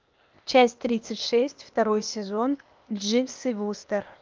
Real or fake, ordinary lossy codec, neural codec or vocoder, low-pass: fake; Opus, 24 kbps; codec, 16 kHz, 0.8 kbps, ZipCodec; 7.2 kHz